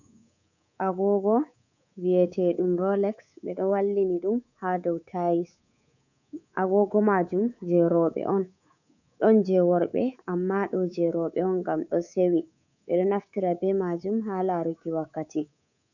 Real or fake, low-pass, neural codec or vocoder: fake; 7.2 kHz; codec, 24 kHz, 3.1 kbps, DualCodec